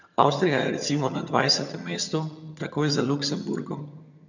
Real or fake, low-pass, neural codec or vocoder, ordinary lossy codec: fake; 7.2 kHz; vocoder, 22.05 kHz, 80 mel bands, HiFi-GAN; none